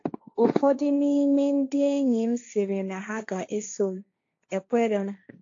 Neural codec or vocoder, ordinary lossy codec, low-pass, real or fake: codec, 16 kHz, 1.1 kbps, Voila-Tokenizer; AAC, 48 kbps; 7.2 kHz; fake